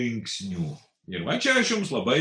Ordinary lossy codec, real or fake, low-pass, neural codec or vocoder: MP3, 96 kbps; real; 9.9 kHz; none